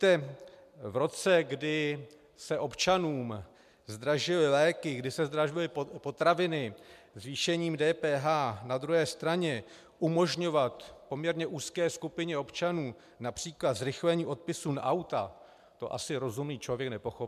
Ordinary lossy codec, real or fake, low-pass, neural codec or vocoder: MP3, 96 kbps; real; 14.4 kHz; none